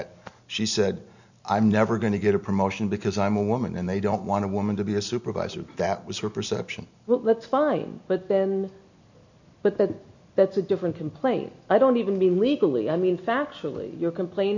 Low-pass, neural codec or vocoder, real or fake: 7.2 kHz; none; real